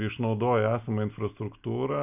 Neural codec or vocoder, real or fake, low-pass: none; real; 3.6 kHz